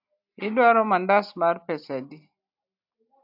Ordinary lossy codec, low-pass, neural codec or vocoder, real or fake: AAC, 48 kbps; 5.4 kHz; none; real